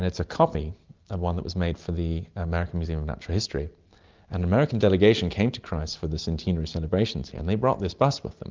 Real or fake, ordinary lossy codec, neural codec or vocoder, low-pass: real; Opus, 32 kbps; none; 7.2 kHz